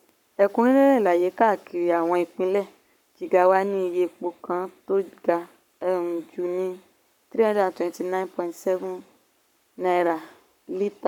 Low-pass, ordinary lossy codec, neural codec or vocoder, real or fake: 19.8 kHz; none; codec, 44.1 kHz, 7.8 kbps, Pupu-Codec; fake